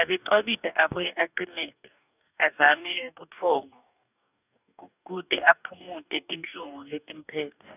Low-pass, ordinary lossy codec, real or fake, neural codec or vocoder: 3.6 kHz; none; fake; codec, 44.1 kHz, 2.6 kbps, DAC